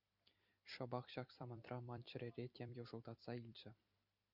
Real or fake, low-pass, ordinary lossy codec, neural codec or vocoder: fake; 5.4 kHz; Opus, 64 kbps; vocoder, 44.1 kHz, 128 mel bands every 256 samples, BigVGAN v2